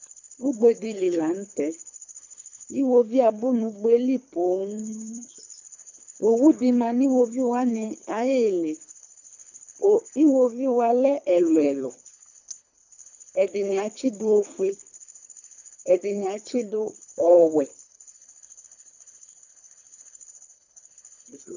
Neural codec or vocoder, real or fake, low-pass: codec, 24 kHz, 3 kbps, HILCodec; fake; 7.2 kHz